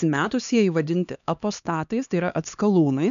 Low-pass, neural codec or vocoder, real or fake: 7.2 kHz; codec, 16 kHz, 2 kbps, X-Codec, HuBERT features, trained on LibriSpeech; fake